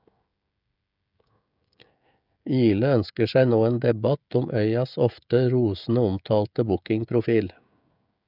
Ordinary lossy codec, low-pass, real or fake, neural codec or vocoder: none; 5.4 kHz; fake; codec, 16 kHz, 16 kbps, FreqCodec, smaller model